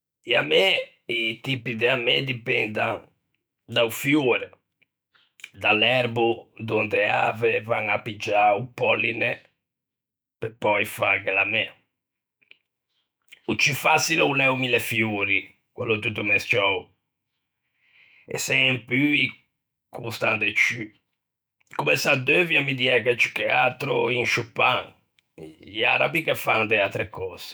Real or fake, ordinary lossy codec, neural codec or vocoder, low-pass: fake; none; autoencoder, 48 kHz, 128 numbers a frame, DAC-VAE, trained on Japanese speech; none